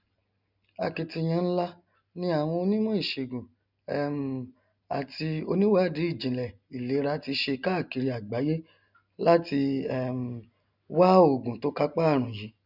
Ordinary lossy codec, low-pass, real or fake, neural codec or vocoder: none; 5.4 kHz; real; none